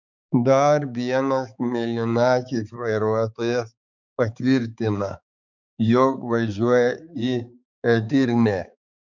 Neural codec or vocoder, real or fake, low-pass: codec, 16 kHz, 4 kbps, X-Codec, HuBERT features, trained on balanced general audio; fake; 7.2 kHz